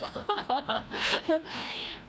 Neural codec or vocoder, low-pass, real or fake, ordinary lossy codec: codec, 16 kHz, 1 kbps, FreqCodec, larger model; none; fake; none